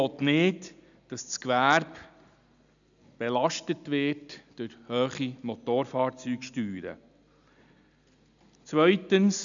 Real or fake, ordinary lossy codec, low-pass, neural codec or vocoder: real; none; 7.2 kHz; none